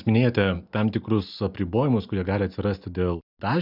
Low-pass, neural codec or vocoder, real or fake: 5.4 kHz; none; real